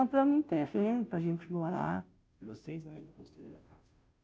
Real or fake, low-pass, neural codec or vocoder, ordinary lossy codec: fake; none; codec, 16 kHz, 0.5 kbps, FunCodec, trained on Chinese and English, 25 frames a second; none